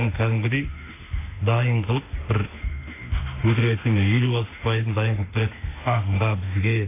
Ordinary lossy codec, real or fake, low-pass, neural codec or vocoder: AAC, 24 kbps; fake; 3.6 kHz; autoencoder, 48 kHz, 32 numbers a frame, DAC-VAE, trained on Japanese speech